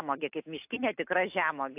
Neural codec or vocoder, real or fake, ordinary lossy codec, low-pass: none; real; AAC, 32 kbps; 3.6 kHz